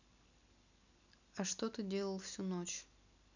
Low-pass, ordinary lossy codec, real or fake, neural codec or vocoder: 7.2 kHz; none; real; none